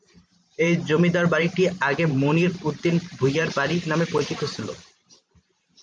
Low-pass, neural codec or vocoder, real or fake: 9.9 kHz; vocoder, 44.1 kHz, 128 mel bands every 512 samples, BigVGAN v2; fake